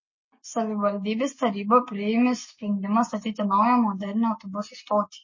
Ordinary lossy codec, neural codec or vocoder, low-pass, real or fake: MP3, 32 kbps; none; 7.2 kHz; real